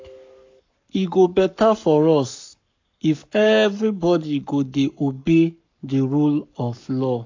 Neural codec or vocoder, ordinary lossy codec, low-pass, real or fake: codec, 44.1 kHz, 7.8 kbps, Pupu-Codec; AAC, 48 kbps; 7.2 kHz; fake